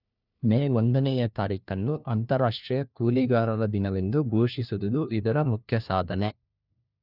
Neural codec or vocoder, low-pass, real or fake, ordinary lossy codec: codec, 16 kHz, 1 kbps, FunCodec, trained on LibriTTS, 50 frames a second; 5.4 kHz; fake; none